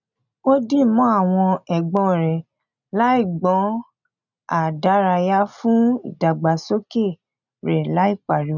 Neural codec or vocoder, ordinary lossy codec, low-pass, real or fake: none; none; 7.2 kHz; real